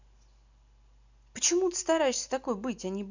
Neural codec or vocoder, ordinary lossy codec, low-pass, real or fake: none; none; 7.2 kHz; real